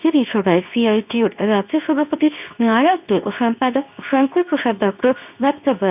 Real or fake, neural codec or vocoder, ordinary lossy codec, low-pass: fake; codec, 24 kHz, 0.9 kbps, WavTokenizer, medium speech release version 2; none; 3.6 kHz